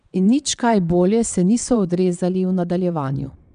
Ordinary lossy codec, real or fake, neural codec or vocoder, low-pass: none; fake; vocoder, 22.05 kHz, 80 mel bands, WaveNeXt; 9.9 kHz